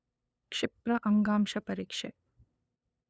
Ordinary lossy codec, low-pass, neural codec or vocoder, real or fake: none; none; codec, 16 kHz, 8 kbps, FunCodec, trained on LibriTTS, 25 frames a second; fake